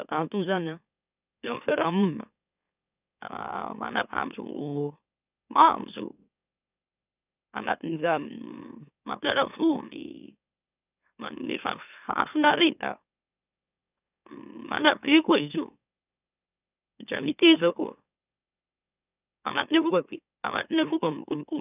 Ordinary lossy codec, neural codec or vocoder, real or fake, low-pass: none; autoencoder, 44.1 kHz, a latent of 192 numbers a frame, MeloTTS; fake; 3.6 kHz